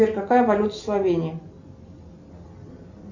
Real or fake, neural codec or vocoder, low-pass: real; none; 7.2 kHz